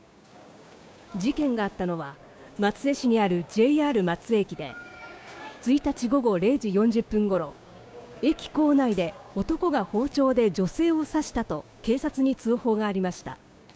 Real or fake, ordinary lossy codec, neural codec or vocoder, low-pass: fake; none; codec, 16 kHz, 6 kbps, DAC; none